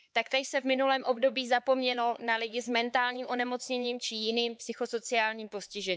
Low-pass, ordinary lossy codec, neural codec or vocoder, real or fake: none; none; codec, 16 kHz, 4 kbps, X-Codec, HuBERT features, trained on LibriSpeech; fake